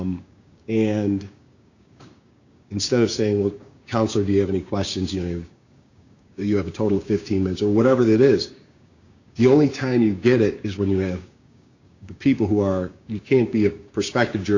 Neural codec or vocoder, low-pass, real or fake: codec, 16 kHz, 6 kbps, DAC; 7.2 kHz; fake